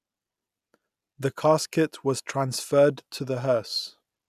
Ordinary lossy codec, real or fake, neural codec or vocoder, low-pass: none; real; none; 14.4 kHz